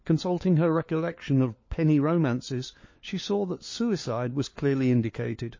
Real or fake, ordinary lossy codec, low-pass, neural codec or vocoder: fake; MP3, 32 kbps; 7.2 kHz; codec, 16 kHz, 4 kbps, FunCodec, trained on LibriTTS, 50 frames a second